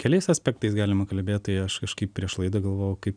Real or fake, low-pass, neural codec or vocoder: real; 9.9 kHz; none